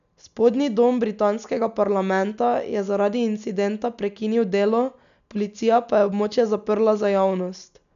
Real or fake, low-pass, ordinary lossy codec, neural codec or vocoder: real; 7.2 kHz; none; none